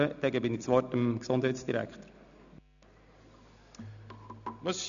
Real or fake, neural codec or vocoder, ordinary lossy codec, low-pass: real; none; none; 7.2 kHz